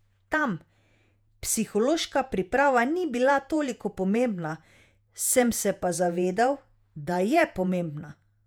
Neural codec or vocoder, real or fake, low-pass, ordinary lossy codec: vocoder, 48 kHz, 128 mel bands, Vocos; fake; 19.8 kHz; none